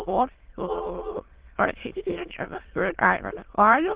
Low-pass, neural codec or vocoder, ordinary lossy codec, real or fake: 3.6 kHz; autoencoder, 22.05 kHz, a latent of 192 numbers a frame, VITS, trained on many speakers; Opus, 16 kbps; fake